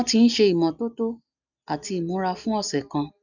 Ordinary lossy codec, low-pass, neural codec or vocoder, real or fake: none; 7.2 kHz; none; real